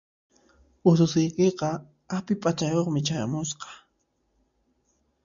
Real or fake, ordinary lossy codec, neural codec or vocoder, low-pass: real; MP3, 64 kbps; none; 7.2 kHz